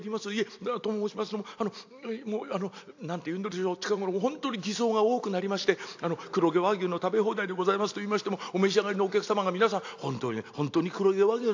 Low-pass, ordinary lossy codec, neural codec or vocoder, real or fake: 7.2 kHz; AAC, 48 kbps; none; real